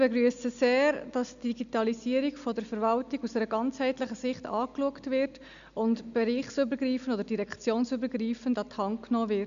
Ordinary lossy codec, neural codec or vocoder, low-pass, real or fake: none; none; 7.2 kHz; real